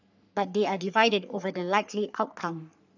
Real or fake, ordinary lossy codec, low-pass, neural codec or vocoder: fake; none; 7.2 kHz; codec, 44.1 kHz, 3.4 kbps, Pupu-Codec